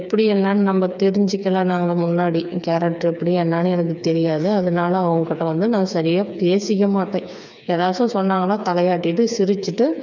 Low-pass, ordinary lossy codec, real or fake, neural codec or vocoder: 7.2 kHz; none; fake; codec, 16 kHz, 4 kbps, FreqCodec, smaller model